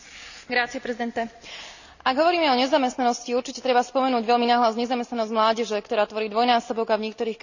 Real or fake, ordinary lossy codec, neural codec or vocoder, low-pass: real; none; none; 7.2 kHz